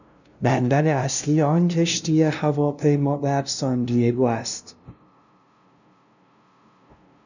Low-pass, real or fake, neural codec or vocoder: 7.2 kHz; fake; codec, 16 kHz, 0.5 kbps, FunCodec, trained on LibriTTS, 25 frames a second